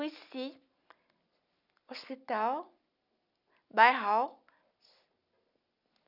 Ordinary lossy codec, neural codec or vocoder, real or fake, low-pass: none; none; real; 5.4 kHz